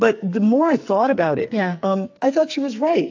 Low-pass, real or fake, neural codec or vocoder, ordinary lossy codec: 7.2 kHz; fake; codec, 44.1 kHz, 2.6 kbps, SNAC; AAC, 48 kbps